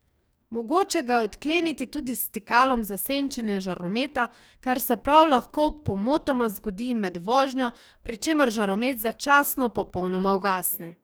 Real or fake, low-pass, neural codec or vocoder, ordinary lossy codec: fake; none; codec, 44.1 kHz, 2.6 kbps, DAC; none